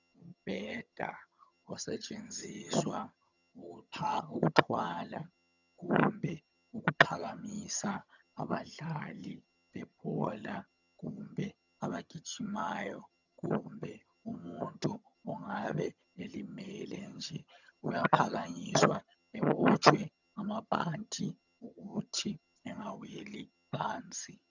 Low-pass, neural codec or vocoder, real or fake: 7.2 kHz; vocoder, 22.05 kHz, 80 mel bands, HiFi-GAN; fake